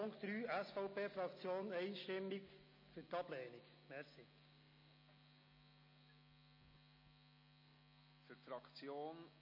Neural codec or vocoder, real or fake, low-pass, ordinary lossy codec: none; real; 5.4 kHz; MP3, 24 kbps